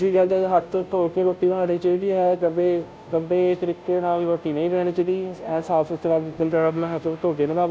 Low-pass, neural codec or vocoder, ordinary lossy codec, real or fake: none; codec, 16 kHz, 0.5 kbps, FunCodec, trained on Chinese and English, 25 frames a second; none; fake